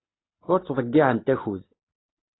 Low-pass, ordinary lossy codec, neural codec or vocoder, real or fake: 7.2 kHz; AAC, 16 kbps; none; real